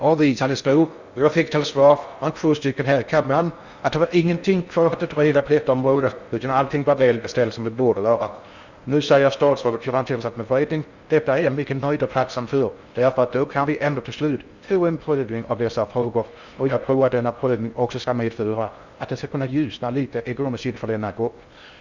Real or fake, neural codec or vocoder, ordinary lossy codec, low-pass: fake; codec, 16 kHz in and 24 kHz out, 0.6 kbps, FocalCodec, streaming, 4096 codes; Opus, 64 kbps; 7.2 kHz